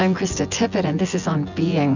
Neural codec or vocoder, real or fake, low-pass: vocoder, 24 kHz, 100 mel bands, Vocos; fake; 7.2 kHz